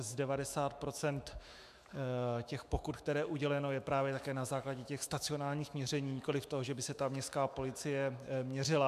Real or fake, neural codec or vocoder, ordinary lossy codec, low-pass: fake; autoencoder, 48 kHz, 128 numbers a frame, DAC-VAE, trained on Japanese speech; MP3, 96 kbps; 14.4 kHz